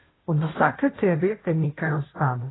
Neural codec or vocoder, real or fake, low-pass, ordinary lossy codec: codec, 16 kHz, 0.5 kbps, FunCodec, trained on Chinese and English, 25 frames a second; fake; 7.2 kHz; AAC, 16 kbps